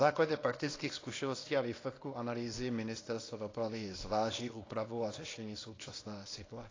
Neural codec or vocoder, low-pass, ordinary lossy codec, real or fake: codec, 24 kHz, 0.9 kbps, WavTokenizer, small release; 7.2 kHz; AAC, 32 kbps; fake